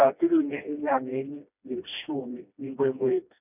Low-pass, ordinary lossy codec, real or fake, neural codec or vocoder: 3.6 kHz; none; fake; codec, 16 kHz, 1 kbps, FreqCodec, smaller model